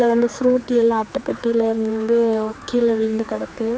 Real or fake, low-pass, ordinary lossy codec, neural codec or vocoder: fake; none; none; codec, 16 kHz, 4 kbps, X-Codec, HuBERT features, trained on general audio